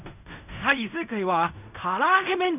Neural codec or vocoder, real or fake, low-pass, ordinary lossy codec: codec, 16 kHz in and 24 kHz out, 0.4 kbps, LongCat-Audio-Codec, fine tuned four codebook decoder; fake; 3.6 kHz; none